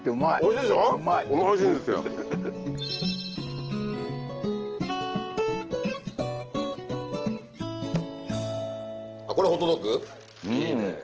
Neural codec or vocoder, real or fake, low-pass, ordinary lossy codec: none; real; 7.2 kHz; Opus, 16 kbps